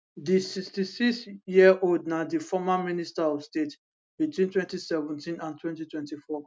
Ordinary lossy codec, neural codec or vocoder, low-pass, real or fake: none; none; none; real